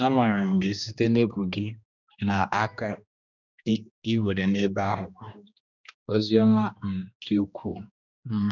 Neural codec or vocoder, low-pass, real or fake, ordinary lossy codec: codec, 16 kHz, 1 kbps, X-Codec, HuBERT features, trained on general audio; 7.2 kHz; fake; none